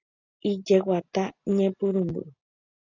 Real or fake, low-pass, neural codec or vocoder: real; 7.2 kHz; none